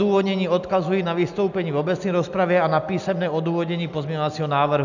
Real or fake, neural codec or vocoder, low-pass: real; none; 7.2 kHz